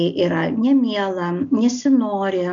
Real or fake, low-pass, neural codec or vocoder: real; 7.2 kHz; none